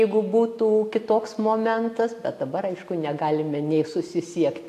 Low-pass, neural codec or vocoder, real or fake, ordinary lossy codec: 14.4 kHz; none; real; AAC, 64 kbps